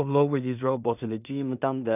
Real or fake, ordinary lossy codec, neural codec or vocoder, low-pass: fake; none; codec, 16 kHz in and 24 kHz out, 0.4 kbps, LongCat-Audio-Codec, two codebook decoder; 3.6 kHz